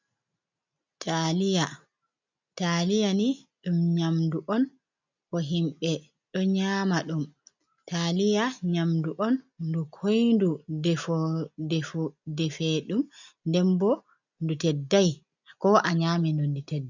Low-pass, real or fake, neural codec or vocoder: 7.2 kHz; real; none